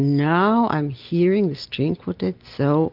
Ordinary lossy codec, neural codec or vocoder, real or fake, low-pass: Opus, 32 kbps; none; real; 5.4 kHz